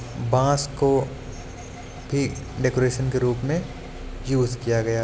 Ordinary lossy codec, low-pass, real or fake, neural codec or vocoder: none; none; real; none